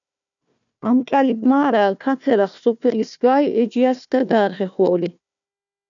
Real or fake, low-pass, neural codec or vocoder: fake; 7.2 kHz; codec, 16 kHz, 1 kbps, FunCodec, trained on Chinese and English, 50 frames a second